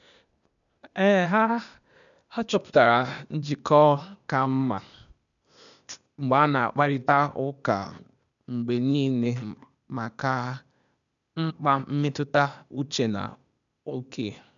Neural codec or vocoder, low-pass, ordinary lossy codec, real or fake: codec, 16 kHz, 0.8 kbps, ZipCodec; 7.2 kHz; none; fake